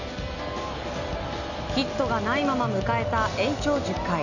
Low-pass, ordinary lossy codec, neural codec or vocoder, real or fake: 7.2 kHz; none; none; real